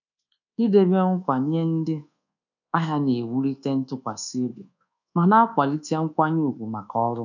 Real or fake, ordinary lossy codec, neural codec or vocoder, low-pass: fake; none; codec, 24 kHz, 1.2 kbps, DualCodec; 7.2 kHz